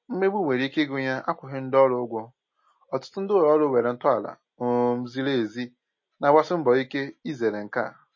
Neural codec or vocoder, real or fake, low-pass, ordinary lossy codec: none; real; 7.2 kHz; MP3, 32 kbps